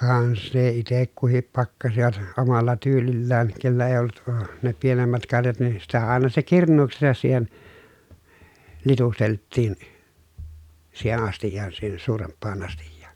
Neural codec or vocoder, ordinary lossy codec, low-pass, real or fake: none; none; 19.8 kHz; real